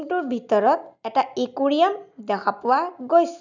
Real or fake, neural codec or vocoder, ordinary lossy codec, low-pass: real; none; none; 7.2 kHz